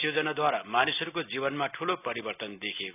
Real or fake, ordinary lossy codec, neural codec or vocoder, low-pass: real; none; none; 3.6 kHz